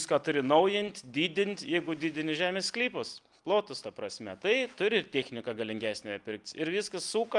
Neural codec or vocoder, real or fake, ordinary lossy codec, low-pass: none; real; Opus, 32 kbps; 10.8 kHz